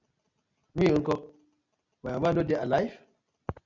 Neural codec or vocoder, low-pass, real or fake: none; 7.2 kHz; real